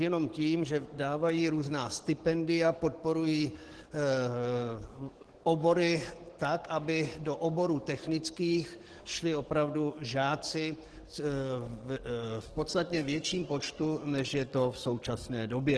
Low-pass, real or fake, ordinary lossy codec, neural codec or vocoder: 10.8 kHz; fake; Opus, 16 kbps; codec, 44.1 kHz, 7.8 kbps, DAC